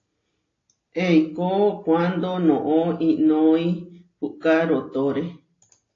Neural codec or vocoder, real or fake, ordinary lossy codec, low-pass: none; real; AAC, 32 kbps; 7.2 kHz